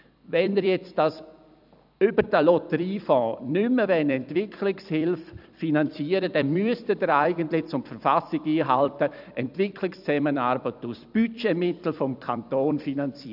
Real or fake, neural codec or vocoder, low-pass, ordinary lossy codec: fake; vocoder, 44.1 kHz, 128 mel bands every 256 samples, BigVGAN v2; 5.4 kHz; none